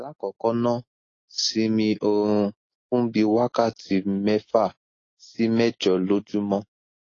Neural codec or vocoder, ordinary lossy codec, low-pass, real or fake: none; AAC, 32 kbps; 7.2 kHz; real